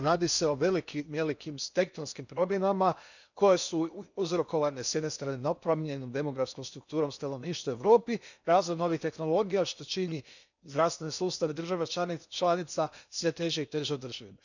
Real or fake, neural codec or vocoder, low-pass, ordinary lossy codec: fake; codec, 16 kHz in and 24 kHz out, 0.8 kbps, FocalCodec, streaming, 65536 codes; 7.2 kHz; none